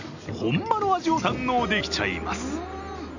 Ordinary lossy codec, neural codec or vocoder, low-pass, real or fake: none; none; 7.2 kHz; real